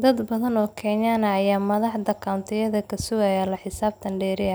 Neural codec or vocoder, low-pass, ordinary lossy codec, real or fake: none; none; none; real